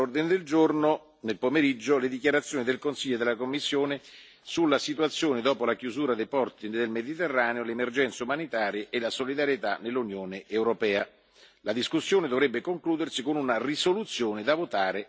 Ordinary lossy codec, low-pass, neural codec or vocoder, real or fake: none; none; none; real